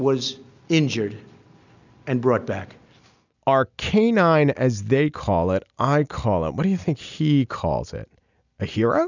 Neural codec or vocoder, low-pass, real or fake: none; 7.2 kHz; real